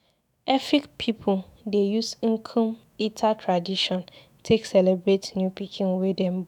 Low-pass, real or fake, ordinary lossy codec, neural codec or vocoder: 19.8 kHz; fake; none; autoencoder, 48 kHz, 128 numbers a frame, DAC-VAE, trained on Japanese speech